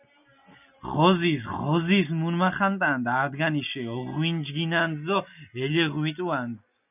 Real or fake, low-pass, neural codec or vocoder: real; 3.6 kHz; none